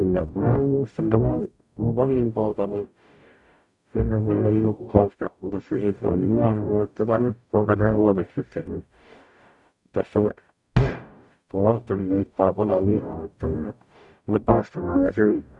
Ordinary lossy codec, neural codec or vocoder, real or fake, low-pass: none; codec, 44.1 kHz, 0.9 kbps, DAC; fake; 10.8 kHz